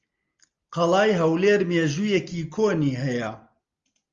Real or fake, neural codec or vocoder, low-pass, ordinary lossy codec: real; none; 7.2 kHz; Opus, 32 kbps